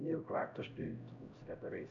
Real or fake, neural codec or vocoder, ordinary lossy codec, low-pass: fake; codec, 16 kHz, 0.5 kbps, X-Codec, HuBERT features, trained on LibriSpeech; MP3, 64 kbps; 7.2 kHz